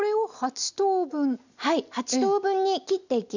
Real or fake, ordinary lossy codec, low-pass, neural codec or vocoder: real; none; 7.2 kHz; none